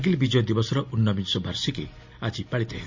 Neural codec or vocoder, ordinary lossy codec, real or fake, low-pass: none; MP3, 48 kbps; real; 7.2 kHz